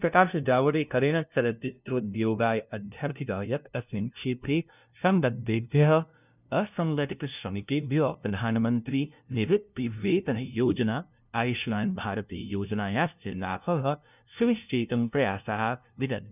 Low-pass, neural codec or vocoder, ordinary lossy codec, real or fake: 3.6 kHz; codec, 16 kHz, 0.5 kbps, FunCodec, trained on LibriTTS, 25 frames a second; none; fake